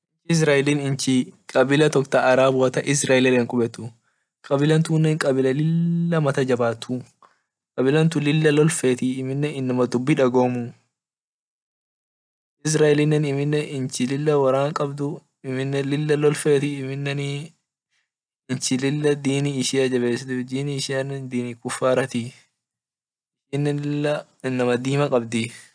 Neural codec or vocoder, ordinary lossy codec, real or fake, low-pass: none; none; real; 9.9 kHz